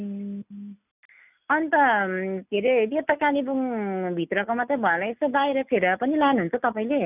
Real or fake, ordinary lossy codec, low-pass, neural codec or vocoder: real; none; 3.6 kHz; none